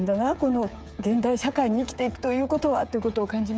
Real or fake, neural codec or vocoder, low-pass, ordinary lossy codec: fake; codec, 16 kHz, 8 kbps, FreqCodec, smaller model; none; none